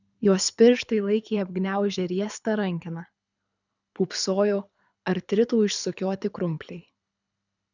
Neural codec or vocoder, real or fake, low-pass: codec, 24 kHz, 6 kbps, HILCodec; fake; 7.2 kHz